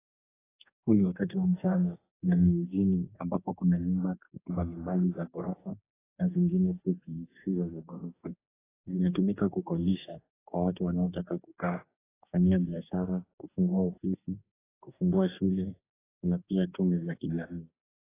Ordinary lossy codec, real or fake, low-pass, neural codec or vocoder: AAC, 16 kbps; fake; 3.6 kHz; codec, 44.1 kHz, 2.6 kbps, DAC